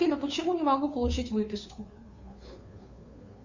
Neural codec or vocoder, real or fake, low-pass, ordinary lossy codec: codec, 16 kHz, 2 kbps, FunCodec, trained on Chinese and English, 25 frames a second; fake; 7.2 kHz; Opus, 64 kbps